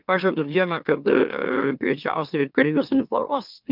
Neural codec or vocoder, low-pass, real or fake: autoencoder, 44.1 kHz, a latent of 192 numbers a frame, MeloTTS; 5.4 kHz; fake